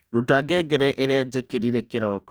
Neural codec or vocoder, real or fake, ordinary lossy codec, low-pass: codec, 44.1 kHz, 2.6 kbps, DAC; fake; none; none